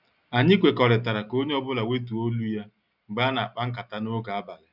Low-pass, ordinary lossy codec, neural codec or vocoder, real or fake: 5.4 kHz; none; none; real